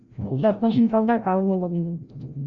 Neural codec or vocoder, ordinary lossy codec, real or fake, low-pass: codec, 16 kHz, 0.5 kbps, FreqCodec, larger model; Opus, 64 kbps; fake; 7.2 kHz